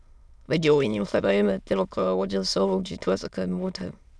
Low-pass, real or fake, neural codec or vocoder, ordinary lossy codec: none; fake; autoencoder, 22.05 kHz, a latent of 192 numbers a frame, VITS, trained on many speakers; none